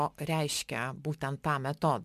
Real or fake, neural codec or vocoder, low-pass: real; none; 14.4 kHz